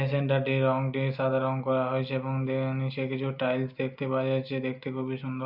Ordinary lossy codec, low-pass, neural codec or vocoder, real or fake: Opus, 64 kbps; 5.4 kHz; none; real